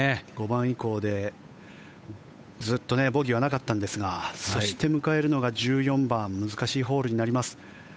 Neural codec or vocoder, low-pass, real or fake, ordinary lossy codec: codec, 16 kHz, 8 kbps, FunCodec, trained on Chinese and English, 25 frames a second; none; fake; none